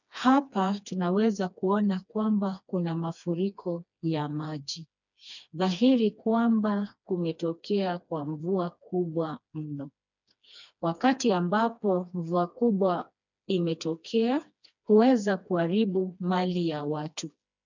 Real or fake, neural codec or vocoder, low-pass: fake; codec, 16 kHz, 2 kbps, FreqCodec, smaller model; 7.2 kHz